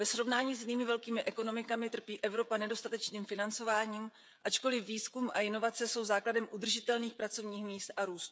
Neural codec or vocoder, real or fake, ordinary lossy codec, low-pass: codec, 16 kHz, 16 kbps, FreqCodec, smaller model; fake; none; none